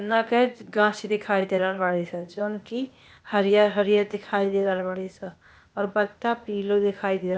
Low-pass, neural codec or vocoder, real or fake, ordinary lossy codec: none; codec, 16 kHz, 0.8 kbps, ZipCodec; fake; none